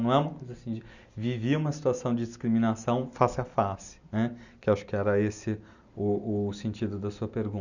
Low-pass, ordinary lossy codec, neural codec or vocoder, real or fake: 7.2 kHz; none; none; real